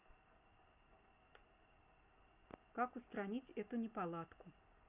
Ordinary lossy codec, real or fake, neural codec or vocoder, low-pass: none; real; none; 3.6 kHz